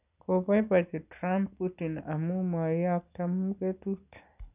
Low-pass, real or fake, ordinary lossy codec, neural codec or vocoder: 3.6 kHz; real; none; none